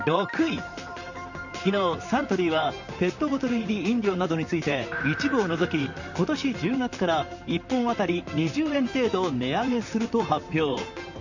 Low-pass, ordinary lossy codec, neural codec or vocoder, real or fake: 7.2 kHz; none; vocoder, 44.1 kHz, 128 mel bands, Pupu-Vocoder; fake